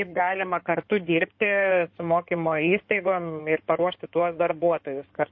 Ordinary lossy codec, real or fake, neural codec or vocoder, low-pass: MP3, 32 kbps; fake; codec, 16 kHz in and 24 kHz out, 2.2 kbps, FireRedTTS-2 codec; 7.2 kHz